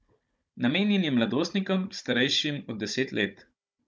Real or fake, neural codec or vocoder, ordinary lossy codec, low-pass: fake; codec, 16 kHz, 16 kbps, FunCodec, trained on Chinese and English, 50 frames a second; none; none